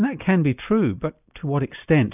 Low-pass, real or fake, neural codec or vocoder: 3.6 kHz; real; none